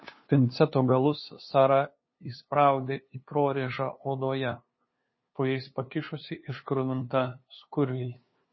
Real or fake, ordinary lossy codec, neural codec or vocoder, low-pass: fake; MP3, 24 kbps; codec, 16 kHz, 2 kbps, FunCodec, trained on LibriTTS, 25 frames a second; 7.2 kHz